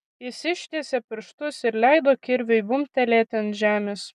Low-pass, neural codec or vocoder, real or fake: 14.4 kHz; none; real